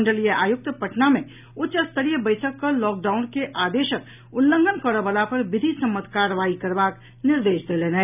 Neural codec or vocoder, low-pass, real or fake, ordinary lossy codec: none; 3.6 kHz; real; none